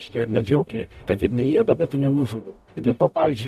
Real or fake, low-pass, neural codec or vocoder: fake; 14.4 kHz; codec, 44.1 kHz, 0.9 kbps, DAC